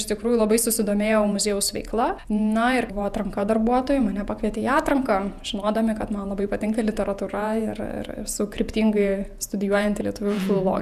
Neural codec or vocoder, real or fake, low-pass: vocoder, 48 kHz, 128 mel bands, Vocos; fake; 14.4 kHz